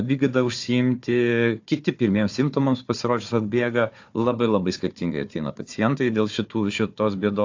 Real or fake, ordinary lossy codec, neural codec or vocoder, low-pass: fake; AAC, 48 kbps; codec, 16 kHz, 4 kbps, FunCodec, trained on Chinese and English, 50 frames a second; 7.2 kHz